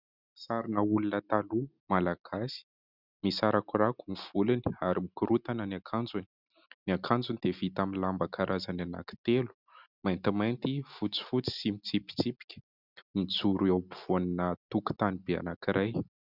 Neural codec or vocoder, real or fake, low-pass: none; real; 5.4 kHz